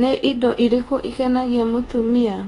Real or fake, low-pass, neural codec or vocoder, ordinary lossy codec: fake; 10.8 kHz; codec, 24 kHz, 1.2 kbps, DualCodec; AAC, 32 kbps